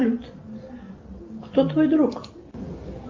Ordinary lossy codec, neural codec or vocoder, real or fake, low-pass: Opus, 32 kbps; none; real; 7.2 kHz